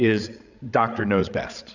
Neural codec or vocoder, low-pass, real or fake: codec, 16 kHz, 16 kbps, FreqCodec, larger model; 7.2 kHz; fake